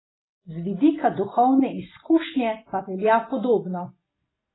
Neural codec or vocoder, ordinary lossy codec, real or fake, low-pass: none; AAC, 16 kbps; real; 7.2 kHz